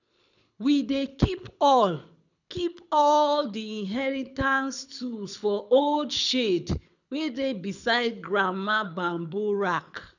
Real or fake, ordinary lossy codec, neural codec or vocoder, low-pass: fake; none; codec, 24 kHz, 6 kbps, HILCodec; 7.2 kHz